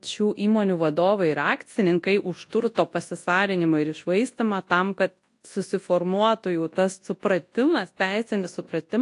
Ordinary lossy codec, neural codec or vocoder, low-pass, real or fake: AAC, 48 kbps; codec, 24 kHz, 0.9 kbps, WavTokenizer, large speech release; 10.8 kHz; fake